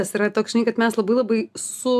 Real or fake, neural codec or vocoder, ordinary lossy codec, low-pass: real; none; AAC, 96 kbps; 14.4 kHz